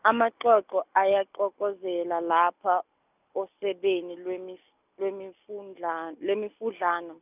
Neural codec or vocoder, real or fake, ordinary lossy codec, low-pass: none; real; none; 3.6 kHz